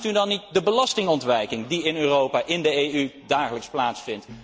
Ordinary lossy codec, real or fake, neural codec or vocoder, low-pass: none; real; none; none